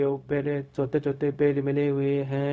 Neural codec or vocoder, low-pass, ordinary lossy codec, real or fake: codec, 16 kHz, 0.4 kbps, LongCat-Audio-Codec; none; none; fake